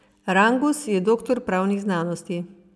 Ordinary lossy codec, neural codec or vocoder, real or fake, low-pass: none; none; real; none